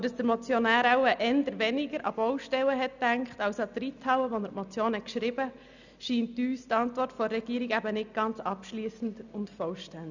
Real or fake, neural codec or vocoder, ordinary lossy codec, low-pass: real; none; none; 7.2 kHz